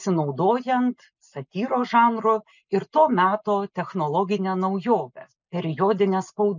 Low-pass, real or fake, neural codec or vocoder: 7.2 kHz; real; none